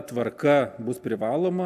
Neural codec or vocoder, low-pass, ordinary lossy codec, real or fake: none; 14.4 kHz; MP3, 96 kbps; real